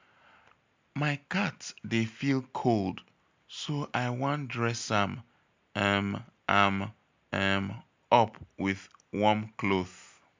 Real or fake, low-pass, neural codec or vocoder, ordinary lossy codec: real; 7.2 kHz; none; MP3, 64 kbps